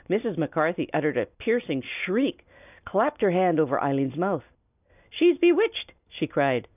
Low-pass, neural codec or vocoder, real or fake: 3.6 kHz; none; real